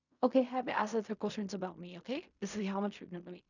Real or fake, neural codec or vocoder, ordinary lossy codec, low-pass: fake; codec, 16 kHz in and 24 kHz out, 0.4 kbps, LongCat-Audio-Codec, fine tuned four codebook decoder; none; 7.2 kHz